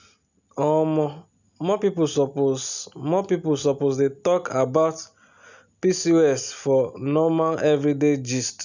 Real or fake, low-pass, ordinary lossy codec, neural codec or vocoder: real; 7.2 kHz; none; none